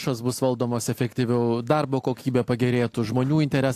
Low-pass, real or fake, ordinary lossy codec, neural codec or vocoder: 14.4 kHz; real; AAC, 64 kbps; none